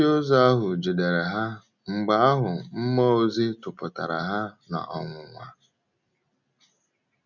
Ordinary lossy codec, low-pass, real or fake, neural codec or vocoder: none; 7.2 kHz; real; none